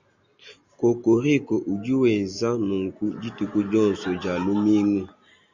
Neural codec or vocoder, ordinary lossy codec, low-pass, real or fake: none; Opus, 64 kbps; 7.2 kHz; real